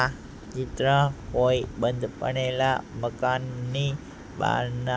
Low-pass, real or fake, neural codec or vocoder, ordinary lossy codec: none; real; none; none